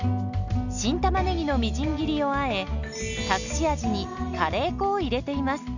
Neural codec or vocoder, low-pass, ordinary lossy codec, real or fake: none; 7.2 kHz; none; real